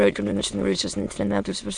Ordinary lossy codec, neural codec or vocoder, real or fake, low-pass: Opus, 64 kbps; autoencoder, 22.05 kHz, a latent of 192 numbers a frame, VITS, trained on many speakers; fake; 9.9 kHz